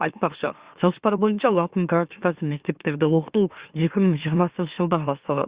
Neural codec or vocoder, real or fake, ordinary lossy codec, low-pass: autoencoder, 44.1 kHz, a latent of 192 numbers a frame, MeloTTS; fake; Opus, 64 kbps; 3.6 kHz